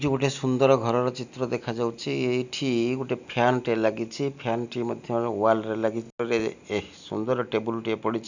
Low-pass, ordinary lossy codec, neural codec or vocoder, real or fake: 7.2 kHz; none; none; real